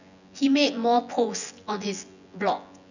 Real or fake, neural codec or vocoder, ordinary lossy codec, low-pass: fake; vocoder, 24 kHz, 100 mel bands, Vocos; none; 7.2 kHz